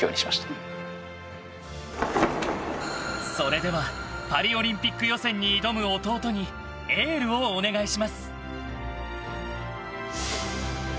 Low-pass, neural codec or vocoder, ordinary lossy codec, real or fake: none; none; none; real